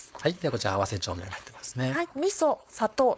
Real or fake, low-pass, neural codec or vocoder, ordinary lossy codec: fake; none; codec, 16 kHz, 4.8 kbps, FACodec; none